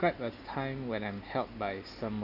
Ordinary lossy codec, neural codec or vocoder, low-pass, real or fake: none; none; 5.4 kHz; real